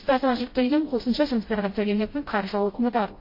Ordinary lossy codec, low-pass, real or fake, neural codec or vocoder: MP3, 24 kbps; 5.4 kHz; fake; codec, 16 kHz, 0.5 kbps, FreqCodec, smaller model